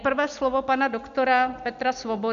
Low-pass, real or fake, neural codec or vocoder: 7.2 kHz; real; none